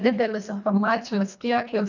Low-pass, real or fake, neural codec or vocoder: 7.2 kHz; fake; codec, 24 kHz, 1.5 kbps, HILCodec